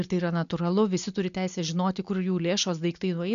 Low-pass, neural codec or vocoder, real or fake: 7.2 kHz; none; real